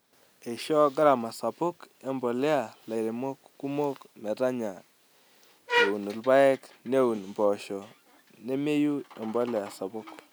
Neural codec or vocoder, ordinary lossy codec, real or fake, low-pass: none; none; real; none